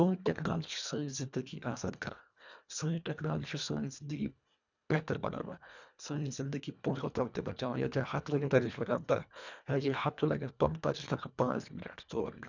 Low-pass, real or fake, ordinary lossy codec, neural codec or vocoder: 7.2 kHz; fake; none; codec, 24 kHz, 1.5 kbps, HILCodec